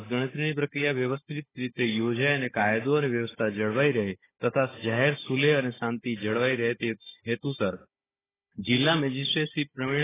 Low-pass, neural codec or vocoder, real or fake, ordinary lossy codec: 3.6 kHz; codec, 16 kHz, 16 kbps, FunCodec, trained on Chinese and English, 50 frames a second; fake; AAC, 16 kbps